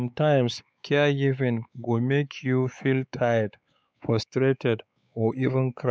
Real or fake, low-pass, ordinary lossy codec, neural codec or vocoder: fake; none; none; codec, 16 kHz, 4 kbps, X-Codec, WavLM features, trained on Multilingual LibriSpeech